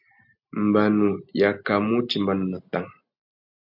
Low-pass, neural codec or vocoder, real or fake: 5.4 kHz; none; real